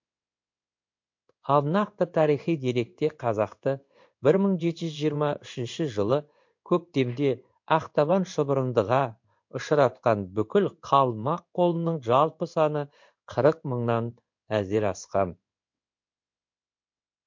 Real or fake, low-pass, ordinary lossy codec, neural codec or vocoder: fake; 7.2 kHz; MP3, 48 kbps; codec, 16 kHz in and 24 kHz out, 1 kbps, XY-Tokenizer